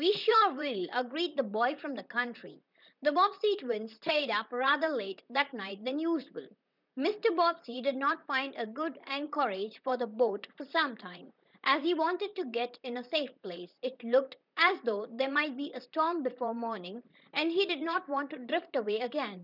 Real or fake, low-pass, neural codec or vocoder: fake; 5.4 kHz; vocoder, 44.1 kHz, 128 mel bands, Pupu-Vocoder